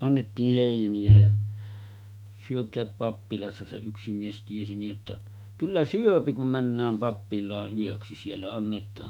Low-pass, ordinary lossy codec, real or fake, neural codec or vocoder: 19.8 kHz; none; fake; autoencoder, 48 kHz, 32 numbers a frame, DAC-VAE, trained on Japanese speech